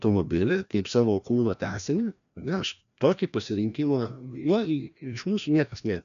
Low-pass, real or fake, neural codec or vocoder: 7.2 kHz; fake; codec, 16 kHz, 1 kbps, FreqCodec, larger model